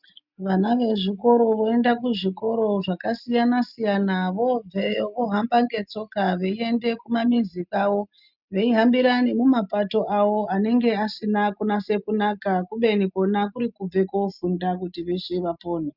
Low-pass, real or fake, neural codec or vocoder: 5.4 kHz; real; none